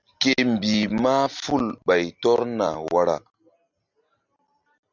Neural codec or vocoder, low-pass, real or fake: none; 7.2 kHz; real